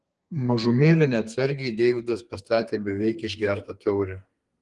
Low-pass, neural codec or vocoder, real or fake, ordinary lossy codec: 10.8 kHz; codec, 32 kHz, 1.9 kbps, SNAC; fake; Opus, 24 kbps